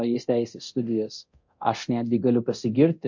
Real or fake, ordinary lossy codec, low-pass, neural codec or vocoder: fake; MP3, 48 kbps; 7.2 kHz; codec, 24 kHz, 0.9 kbps, DualCodec